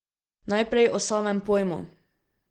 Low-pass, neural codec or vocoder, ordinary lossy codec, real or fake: 19.8 kHz; none; Opus, 16 kbps; real